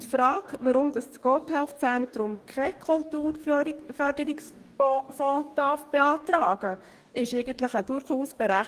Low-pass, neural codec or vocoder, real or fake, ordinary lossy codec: 14.4 kHz; codec, 44.1 kHz, 2.6 kbps, DAC; fake; Opus, 24 kbps